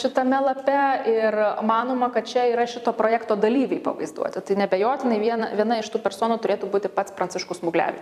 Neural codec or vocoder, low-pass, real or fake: vocoder, 44.1 kHz, 128 mel bands every 256 samples, BigVGAN v2; 14.4 kHz; fake